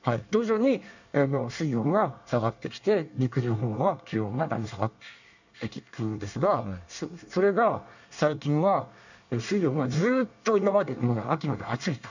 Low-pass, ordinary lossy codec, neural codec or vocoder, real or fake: 7.2 kHz; none; codec, 24 kHz, 1 kbps, SNAC; fake